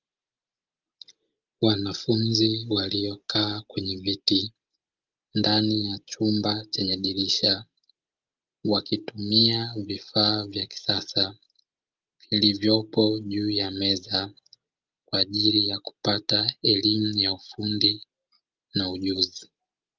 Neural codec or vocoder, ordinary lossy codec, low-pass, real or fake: none; Opus, 24 kbps; 7.2 kHz; real